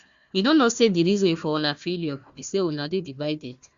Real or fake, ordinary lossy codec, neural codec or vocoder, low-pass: fake; Opus, 64 kbps; codec, 16 kHz, 1 kbps, FunCodec, trained on Chinese and English, 50 frames a second; 7.2 kHz